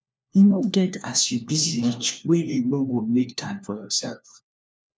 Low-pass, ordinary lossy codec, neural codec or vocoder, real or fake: none; none; codec, 16 kHz, 1 kbps, FunCodec, trained on LibriTTS, 50 frames a second; fake